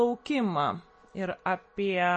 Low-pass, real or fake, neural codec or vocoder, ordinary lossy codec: 9.9 kHz; real; none; MP3, 32 kbps